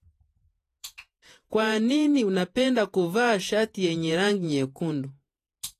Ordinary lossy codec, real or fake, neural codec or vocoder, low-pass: AAC, 48 kbps; fake; vocoder, 48 kHz, 128 mel bands, Vocos; 14.4 kHz